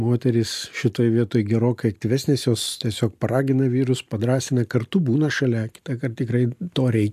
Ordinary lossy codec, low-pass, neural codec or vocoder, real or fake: AAC, 96 kbps; 14.4 kHz; none; real